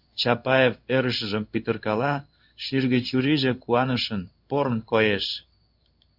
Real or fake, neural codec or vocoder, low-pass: fake; codec, 16 kHz in and 24 kHz out, 1 kbps, XY-Tokenizer; 5.4 kHz